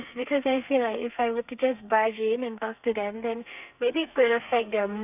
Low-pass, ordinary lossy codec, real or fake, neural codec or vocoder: 3.6 kHz; none; fake; codec, 32 kHz, 1.9 kbps, SNAC